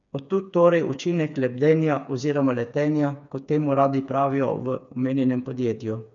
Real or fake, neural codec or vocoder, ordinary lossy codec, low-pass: fake; codec, 16 kHz, 4 kbps, FreqCodec, smaller model; none; 7.2 kHz